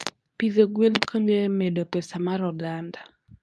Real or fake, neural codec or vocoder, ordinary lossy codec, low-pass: fake; codec, 24 kHz, 0.9 kbps, WavTokenizer, medium speech release version 2; none; none